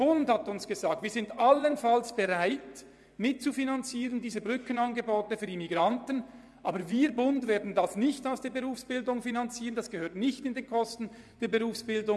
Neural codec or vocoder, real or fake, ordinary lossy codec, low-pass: vocoder, 24 kHz, 100 mel bands, Vocos; fake; none; none